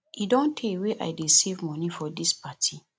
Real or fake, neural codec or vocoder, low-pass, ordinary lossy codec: real; none; none; none